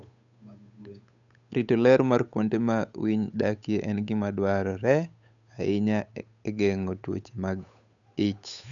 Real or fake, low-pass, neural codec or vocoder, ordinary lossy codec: fake; 7.2 kHz; codec, 16 kHz, 8 kbps, FunCodec, trained on Chinese and English, 25 frames a second; none